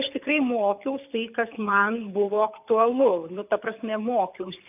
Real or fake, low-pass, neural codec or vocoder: fake; 3.6 kHz; codec, 24 kHz, 6 kbps, HILCodec